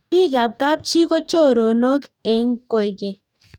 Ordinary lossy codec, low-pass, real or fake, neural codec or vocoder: none; 19.8 kHz; fake; codec, 44.1 kHz, 2.6 kbps, DAC